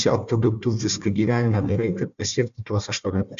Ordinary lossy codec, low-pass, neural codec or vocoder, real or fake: MP3, 64 kbps; 7.2 kHz; codec, 16 kHz, 1 kbps, FunCodec, trained on Chinese and English, 50 frames a second; fake